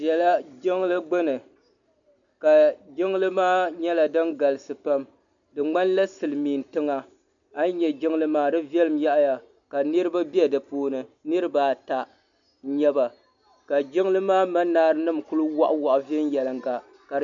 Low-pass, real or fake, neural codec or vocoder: 7.2 kHz; real; none